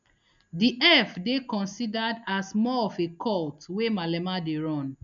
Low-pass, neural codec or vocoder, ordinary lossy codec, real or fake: 7.2 kHz; none; none; real